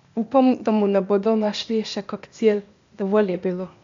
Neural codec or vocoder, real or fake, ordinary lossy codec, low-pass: codec, 16 kHz, 0.8 kbps, ZipCodec; fake; MP3, 64 kbps; 7.2 kHz